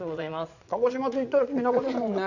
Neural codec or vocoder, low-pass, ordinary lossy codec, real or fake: vocoder, 22.05 kHz, 80 mel bands, Vocos; 7.2 kHz; none; fake